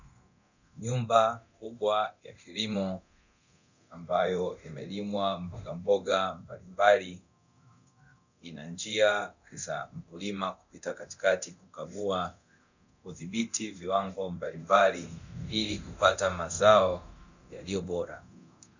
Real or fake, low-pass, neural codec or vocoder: fake; 7.2 kHz; codec, 24 kHz, 0.9 kbps, DualCodec